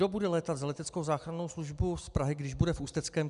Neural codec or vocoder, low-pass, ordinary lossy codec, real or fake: none; 10.8 kHz; MP3, 96 kbps; real